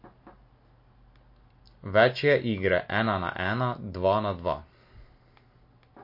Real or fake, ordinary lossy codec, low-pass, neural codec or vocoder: real; MP3, 32 kbps; 5.4 kHz; none